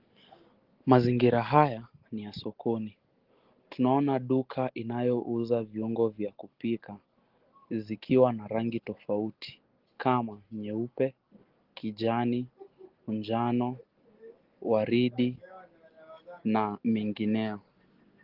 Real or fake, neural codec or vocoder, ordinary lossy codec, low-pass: real; none; Opus, 16 kbps; 5.4 kHz